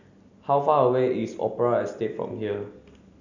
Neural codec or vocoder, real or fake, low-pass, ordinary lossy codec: none; real; 7.2 kHz; none